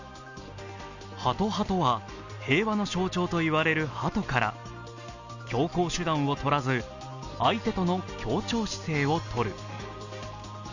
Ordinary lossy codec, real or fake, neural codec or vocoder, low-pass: none; real; none; 7.2 kHz